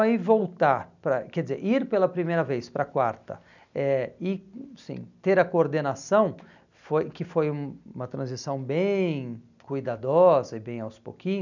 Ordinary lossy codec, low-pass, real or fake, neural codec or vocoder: none; 7.2 kHz; real; none